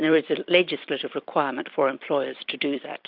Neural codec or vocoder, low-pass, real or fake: vocoder, 44.1 kHz, 128 mel bands every 256 samples, BigVGAN v2; 5.4 kHz; fake